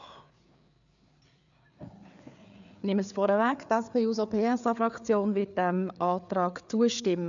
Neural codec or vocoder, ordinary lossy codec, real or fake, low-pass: codec, 16 kHz, 4 kbps, FreqCodec, larger model; none; fake; 7.2 kHz